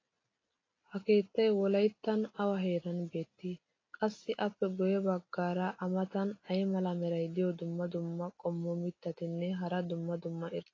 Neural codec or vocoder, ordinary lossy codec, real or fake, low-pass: none; AAC, 32 kbps; real; 7.2 kHz